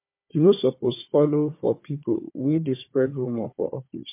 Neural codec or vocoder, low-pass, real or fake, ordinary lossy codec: codec, 16 kHz, 4 kbps, FunCodec, trained on Chinese and English, 50 frames a second; 3.6 kHz; fake; MP3, 24 kbps